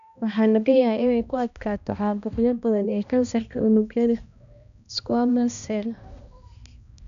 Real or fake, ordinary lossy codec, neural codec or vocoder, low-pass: fake; none; codec, 16 kHz, 1 kbps, X-Codec, HuBERT features, trained on balanced general audio; 7.2 kHz